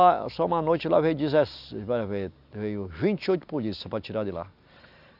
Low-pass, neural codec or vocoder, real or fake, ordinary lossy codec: 5.4 kHz; none; real; none